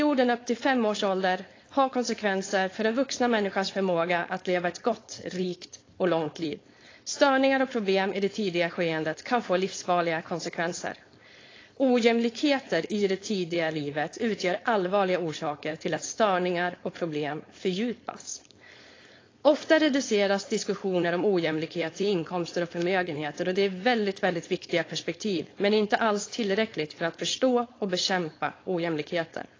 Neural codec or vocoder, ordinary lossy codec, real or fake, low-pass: codec, 16 kHz, 4.8 kbps, FACodec; AAC, 32 kbps; fake; 7.2 kHz